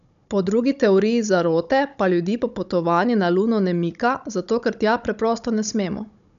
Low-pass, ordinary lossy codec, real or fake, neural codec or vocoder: 7.2 kHz; none; fake; codec, 16 kHz, 16 kbps, FunCodec, trained on Chinese and English, 50 frames a second